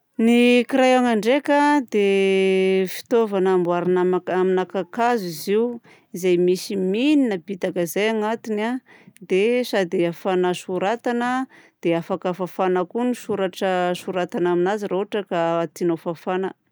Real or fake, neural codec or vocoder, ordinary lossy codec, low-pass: real; none; none; none